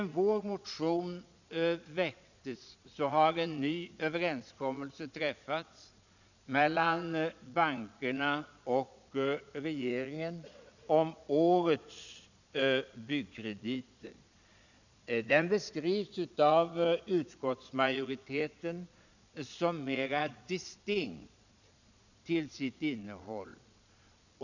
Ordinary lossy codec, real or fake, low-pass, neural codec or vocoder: none; fake; 7.2 kHz; vocoder, 22.05 kHz, 80 mel bands, Vocos